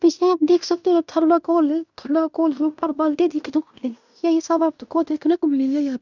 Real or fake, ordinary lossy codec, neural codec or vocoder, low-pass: fake; none; codec, 16 kHz in and 24 kHz out, 0.9 kbps, LongCat-Audio-Codec, fine tuned four codebook decoder; 7.2 kHz